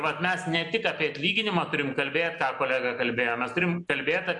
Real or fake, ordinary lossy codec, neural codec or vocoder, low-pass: real; MP3, 64 kbps; none; 10.8 kHz